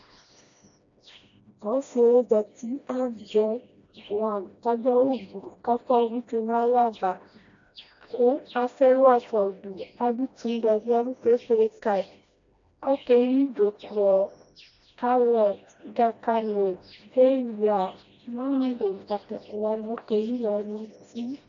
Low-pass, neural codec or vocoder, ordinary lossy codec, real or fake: 7.2 kHz; codec, 16 kHz, 1 kbps, FreqCodec, smaller model; MP3, 64 kbps; fake